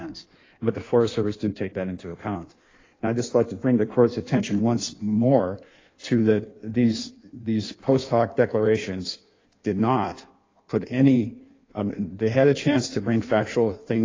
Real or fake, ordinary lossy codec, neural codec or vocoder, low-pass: fake; AAC, 32 kbps; codec, 16 kHz in and 24 kHz out, 1.1 kbps, FireRedTTS-2 codec; 7.2 kHz